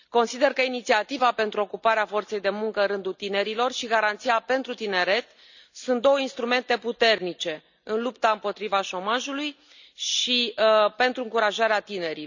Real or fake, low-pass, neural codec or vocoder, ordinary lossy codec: real; 7.2 kHz; none; none